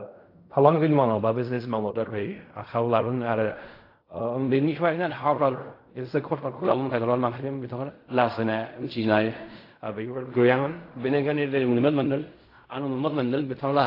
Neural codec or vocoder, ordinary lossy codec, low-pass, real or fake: codec, 16 kHz in and 24 kHz out, 0.4 kbps, LongCat-Audio-Codec, fine tuned four codebook decoder; AAC, 32 kbps; 5.4 kHz; fake